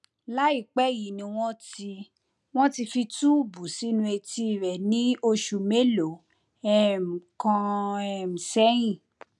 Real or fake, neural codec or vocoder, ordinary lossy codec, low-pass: real; none; none; 10.8 kHz